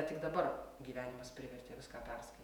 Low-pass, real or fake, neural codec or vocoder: 19.8 kHz; fake; vocoder, 48 kHz, 128 mel bands, Vocos